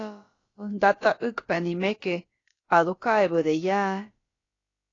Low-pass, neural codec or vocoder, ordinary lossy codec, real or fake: 7.2 kHz; codec, 16 kHz, about 1 kbps, DyCAST, with the encoder's durations; AAC, 32 kbps; fake